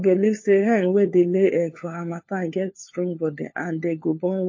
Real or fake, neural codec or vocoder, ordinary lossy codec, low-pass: fake; codec, 16 kHz, 4 kbps, FunCodec, trained on LibriTTS, 50 frames a second; MP3, 32 kbps; 7.2 kHz